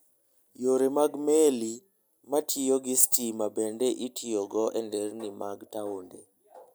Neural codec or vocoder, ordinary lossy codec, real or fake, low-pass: none; none; real; none